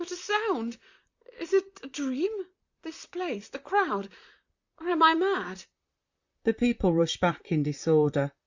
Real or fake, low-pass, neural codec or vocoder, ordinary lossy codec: fake; 7.2 kHz; vocoder, 44.1 kHz, 128 mel bands, Pupu-Vocoder; Opus, 64 kbps